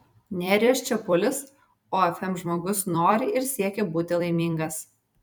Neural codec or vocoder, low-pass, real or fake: vocoder, 44.1 kHz, 128 mel bands every 256 samples, BigVGAN v2; 19.8 kHz; fake